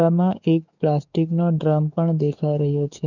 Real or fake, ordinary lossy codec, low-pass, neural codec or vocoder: fake; none; 7.2 kHz; codec, 44.1 kHz, 7.8 kbps, DAC